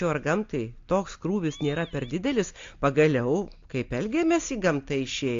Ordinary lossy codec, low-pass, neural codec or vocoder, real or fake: AAC, 48 kbps; 7.2 kHz; none; real